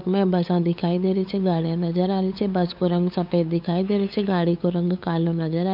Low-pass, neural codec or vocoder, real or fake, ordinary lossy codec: 5.4 kHz; codec, 16 kHz, 8 kbps, FunCodec, trained on LibriTTS, 25 frames a second; fake; none